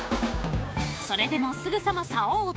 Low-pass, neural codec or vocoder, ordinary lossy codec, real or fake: none; codec, 16 kHz, 6 kbps, DAC; none; fake